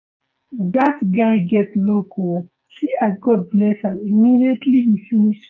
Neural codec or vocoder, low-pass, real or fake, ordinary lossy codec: codec, 44.1 kHz, 2.6 kbps, SNAC; 7.2 kHz; fake; none